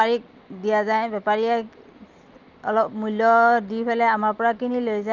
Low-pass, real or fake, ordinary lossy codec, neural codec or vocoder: 7.2 kHz; real; Opus, 24 kbps; none